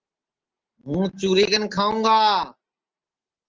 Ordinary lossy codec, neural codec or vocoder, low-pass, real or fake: Opus, 16 kbps; none; 7.2 kHz; real